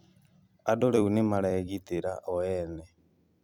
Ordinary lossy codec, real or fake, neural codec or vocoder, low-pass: none; fake; vocoder, 44.1 kHz, 128 mel bands every 256 samples, BigVGAN v2; 19.8 kHz